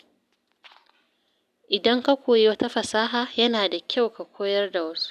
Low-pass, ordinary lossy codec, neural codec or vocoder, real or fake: 14.4 kHz; none; none; real